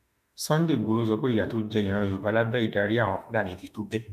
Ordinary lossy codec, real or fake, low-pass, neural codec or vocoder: none; fake; 14.4 kHz; autoencoder, 48 kHz, 32 numbers a frame, DAC-VAE, trained on Japanese speech